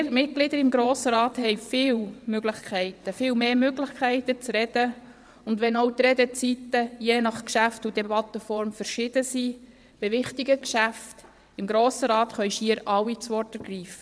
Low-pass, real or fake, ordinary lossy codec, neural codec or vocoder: none; fake; none; vocoder, 22.05 kHz, 80 mel bands, WaveNeXt